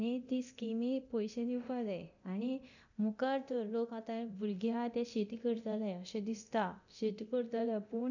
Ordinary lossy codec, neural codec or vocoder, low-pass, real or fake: none; codec, 24 kHz, 0.9 kbps, DualCodec; 7.2 kHz; fake